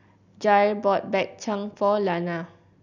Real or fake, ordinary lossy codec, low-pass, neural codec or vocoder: real; none; 7.2 kHz; none